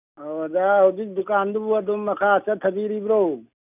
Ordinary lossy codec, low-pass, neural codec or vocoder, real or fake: none; 3.6 kHz; none; real